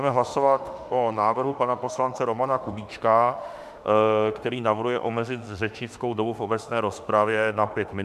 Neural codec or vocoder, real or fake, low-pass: autoencoder, 48 kHz, 32 numbers a frame, DAC-VAE, trained on Japanese speech; fake; 14.4 kHz